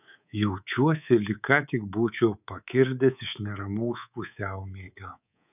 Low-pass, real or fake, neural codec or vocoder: 3.6 kHz; fake; codec, 24 kHz, 3.1 kbps, DualCodec